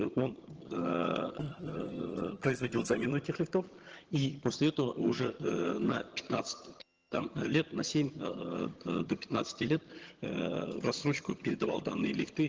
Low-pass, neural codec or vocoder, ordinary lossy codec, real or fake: 7.2 kHz; vocoder, 22.05 kHz, 80 mel bands, HiFi-GAN; Opus, 16 kbps; fake